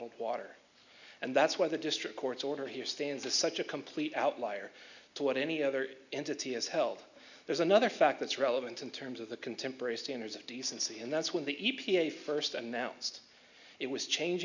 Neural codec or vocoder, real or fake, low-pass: none; real; 7.2 kHz